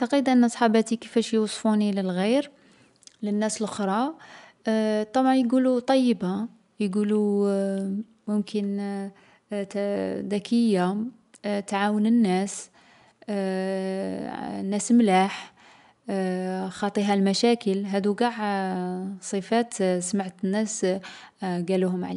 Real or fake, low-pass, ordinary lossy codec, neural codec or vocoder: real; 10.8 kHz; none; none